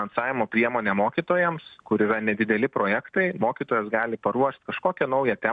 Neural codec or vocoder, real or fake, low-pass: none; real; 9.9 kHz